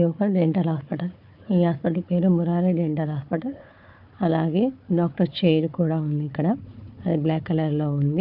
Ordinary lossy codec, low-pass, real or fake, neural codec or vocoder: MP3, 48 kbps; 5.4 kHz; fake; codec, 16 kHz, 4 kbps, FunCodec, trained on Chinese and English, 50 frames a second